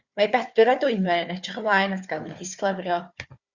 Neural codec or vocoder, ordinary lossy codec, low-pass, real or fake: codec, 16 kHz, 4 kbps, FunCodec, trained on Chinese and English, 50 frames a second; Opus, 64 kbps; 7.2 kHz; fake